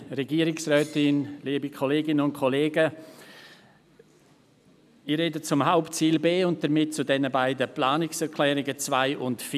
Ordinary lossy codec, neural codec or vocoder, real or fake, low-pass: none; none; real; 14.4 kHz